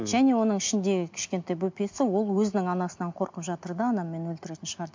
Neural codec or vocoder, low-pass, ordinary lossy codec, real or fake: none; 7.2 kHz; MP3, 48 kbps; real